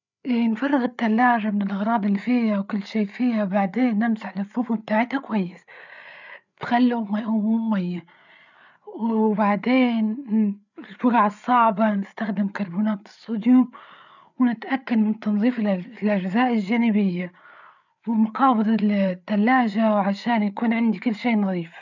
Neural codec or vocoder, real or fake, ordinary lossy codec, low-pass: codec, 16 kHz, 8 kbps, FreqCodec, larger model; fake; none; 7.2 kHz